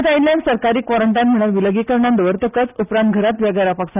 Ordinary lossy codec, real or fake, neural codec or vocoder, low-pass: none; real; none; 3.6 kHz